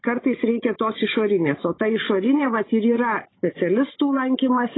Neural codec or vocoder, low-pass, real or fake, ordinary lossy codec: codec, 16 kHz, 16 kbps, FunCodec, trained on Chinese and English, 50 frames a second; 7.2 kHz; fake; AAC, 16 kbps